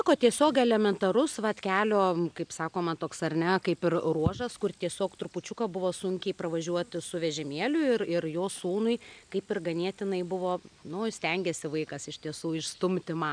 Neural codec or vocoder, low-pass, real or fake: none; 9.9 kHz; real